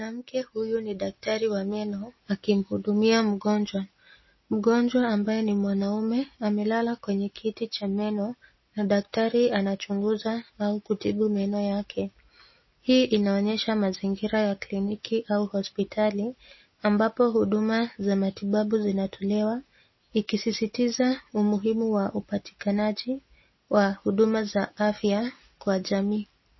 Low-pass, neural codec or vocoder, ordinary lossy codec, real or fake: 7.2 kHz; none; MP3, 24 kbps; real